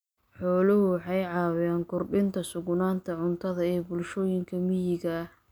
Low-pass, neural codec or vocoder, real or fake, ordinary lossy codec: none; none; real; none